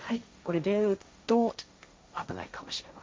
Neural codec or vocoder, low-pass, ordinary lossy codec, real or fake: codec, 16 kHz, 1.1 kbps, Voila-Tokenizer; none; none; fake